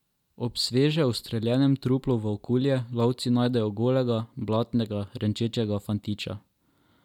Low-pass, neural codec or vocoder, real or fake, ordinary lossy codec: 19.8 kHz; none; real; none